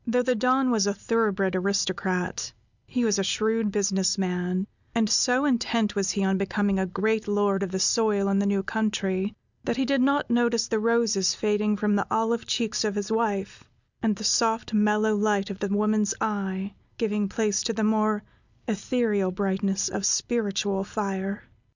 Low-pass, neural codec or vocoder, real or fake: 7.2 kHz; none; real